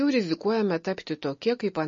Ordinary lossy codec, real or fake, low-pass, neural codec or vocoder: MP3, 32 kbps; real; 7.2 kHz; none